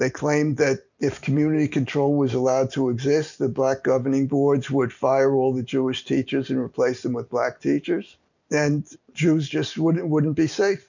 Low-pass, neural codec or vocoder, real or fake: 7.2 kHz; none; real